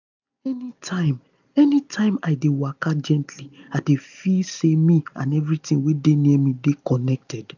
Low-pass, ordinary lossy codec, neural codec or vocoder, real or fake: 7.2 kHz; none; none; real